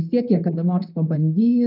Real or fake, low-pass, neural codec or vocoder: fake; 5.4 kHz; vocoder, 44.1 kHz, 128 mel bands, Pupu-Vocoder